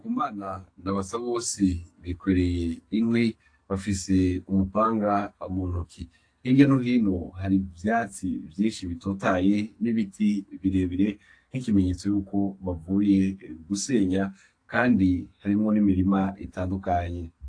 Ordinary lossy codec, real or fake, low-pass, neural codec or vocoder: AAC, 48 kbps; fake; 9.9 kHz; codec, 44.1 kHz, 2.6 kbps, SNAC